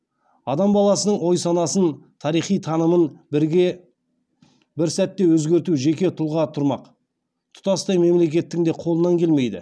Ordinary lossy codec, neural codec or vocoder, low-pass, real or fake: none; none; none; real